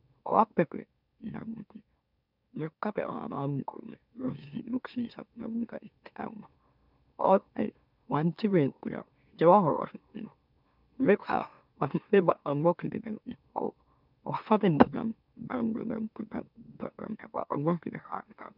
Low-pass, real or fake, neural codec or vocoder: 5.4 kHz; fake; autoencoder, 44.1 kHz, a latent of 192 numbers a frame, MeloTTS